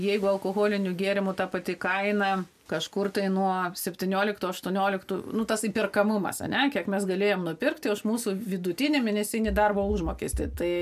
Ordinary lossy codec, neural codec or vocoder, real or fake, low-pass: MP3, 96 kbps; none; real; 14.4 kHz